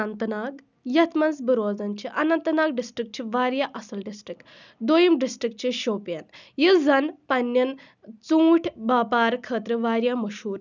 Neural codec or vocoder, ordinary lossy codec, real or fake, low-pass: none; none; real; 7.2 kHz